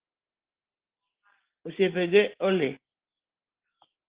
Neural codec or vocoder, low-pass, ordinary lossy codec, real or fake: none; 3.6 kHz; Opus, 16 kbps; real